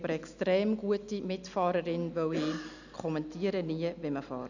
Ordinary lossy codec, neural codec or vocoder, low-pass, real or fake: none; none; 7.2 kHz; real